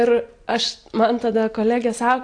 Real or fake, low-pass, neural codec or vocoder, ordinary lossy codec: real; 9.9 kHz; none; AAC, 48 kbps